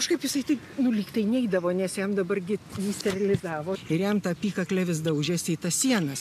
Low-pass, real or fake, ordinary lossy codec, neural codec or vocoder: 14.4 kHz; real; AAC, 96 kbps; none